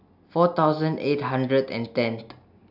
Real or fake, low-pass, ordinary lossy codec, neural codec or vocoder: real; 5.4 kHz; AAC, 48 kbps; none